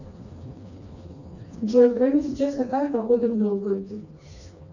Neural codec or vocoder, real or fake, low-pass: codec, 16 kHz, 2 kbps, FreqCodec, smaller model; fake; 7.2 kHz